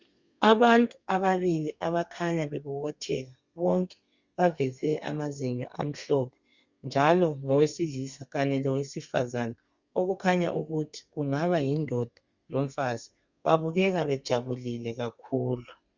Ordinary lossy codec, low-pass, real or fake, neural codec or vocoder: Opus, 64 kbps; 7.2 kHz; fake; codec, 32 kHz, 1.9 kbps, SNAC